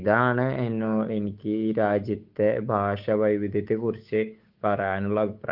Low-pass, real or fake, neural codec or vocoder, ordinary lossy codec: 5.4 kHz; fake; codec, 16 kHz, 8 kbps, FunCodec, trained on Chinese and English, 25 frames a second; Opus, 32 kbps